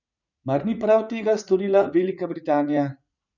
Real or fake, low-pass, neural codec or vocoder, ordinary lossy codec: fake; 7.2 kHz; vocoder, 44.1 kHz, 80 mel bands, Vocos; none